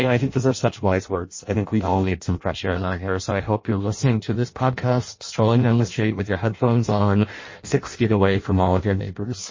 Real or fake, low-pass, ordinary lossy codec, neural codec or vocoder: fake; 7.2 kHz; MP3, 32 kbps; codec, 16 kHz in and 24 kHz out, 0.6 kbps, FireRedTTS-2 codec